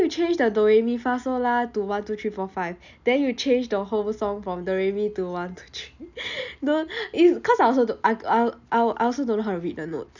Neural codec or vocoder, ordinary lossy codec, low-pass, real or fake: none; none; 7.2 kHz; real